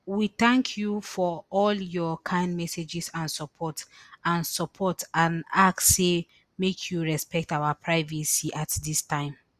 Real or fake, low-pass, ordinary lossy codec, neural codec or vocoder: real; 14.4 kHz; Opus, 64 kbps; none